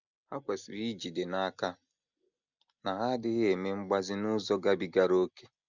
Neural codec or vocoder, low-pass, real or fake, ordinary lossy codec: none; 7.2 kHz; real; none